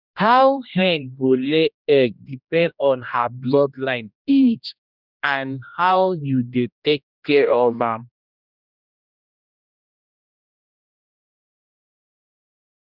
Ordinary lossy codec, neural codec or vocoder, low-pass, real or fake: none; codec, 16 kHz, 1 kbps, X-Codec, HuBERT features, trained on general audio; 5.4 kHz; fake